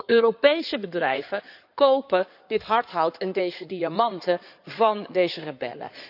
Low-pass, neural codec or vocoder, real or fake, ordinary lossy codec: 5.4 kHz; codec, 16 kHz in and 24 kHz out, 2.2 kbps, FireRedTTS-2 codec; fake; none